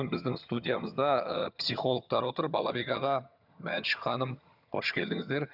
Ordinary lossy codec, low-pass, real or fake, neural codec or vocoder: none; 5.4 kHz; fake; vocoder, 22.05 kHz, 80 mel bands, HiFi-GAN